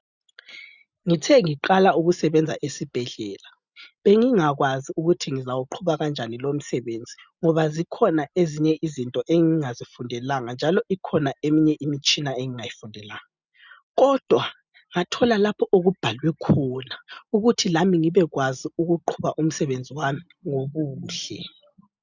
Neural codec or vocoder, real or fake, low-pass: none; real; 7.2 kHz